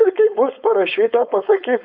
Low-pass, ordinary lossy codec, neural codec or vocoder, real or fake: 5.4 kHz; AAC, 48 kbps; codec, 16 kHz, 4.8 kbps, FACodec; fake